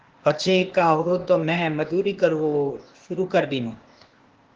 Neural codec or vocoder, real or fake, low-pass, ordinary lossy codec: codec, 16 kHz, 0.8 kbps, ZipCodec; fake; 7.2 kHz; Opus, 16 kbps